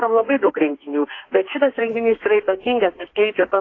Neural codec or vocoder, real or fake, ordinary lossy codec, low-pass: codec, 32 kHz, 1.9 kbps, SNAC; fake; AAC, 32 kbps; 7.2 kHz